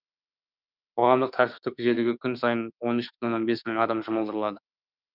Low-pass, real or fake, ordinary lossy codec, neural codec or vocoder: 5.4 kHz; fake; none; autoencoder, 48 kHz, 32 numbers a frame, DAC-VAE, trained on Japanese speech